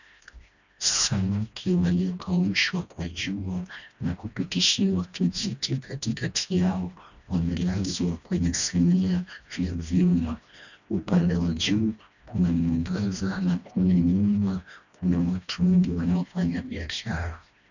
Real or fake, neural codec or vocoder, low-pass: fake; codec, 16 kHz, 1 kbps, FreqCodec, smaller model; 7.2 kHz